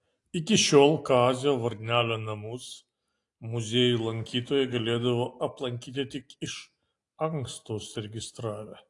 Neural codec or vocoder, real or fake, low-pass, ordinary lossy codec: none; real; 10.8 kHz; AAC, 48 kbps